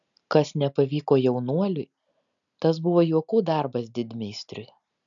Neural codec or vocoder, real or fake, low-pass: none; real; 7.2 kHz